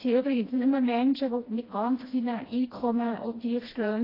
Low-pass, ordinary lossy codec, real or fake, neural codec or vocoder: 5.4 kHz; AAC, 24 kbps; fake; codec, 16 kHz, 1 kbps, FreqCodec, smaller model